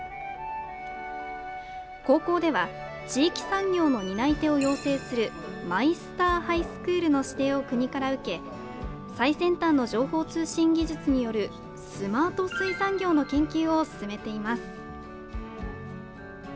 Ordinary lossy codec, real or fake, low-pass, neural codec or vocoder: none; real; none; none